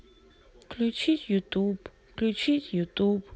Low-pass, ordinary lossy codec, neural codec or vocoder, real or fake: none; none; none; real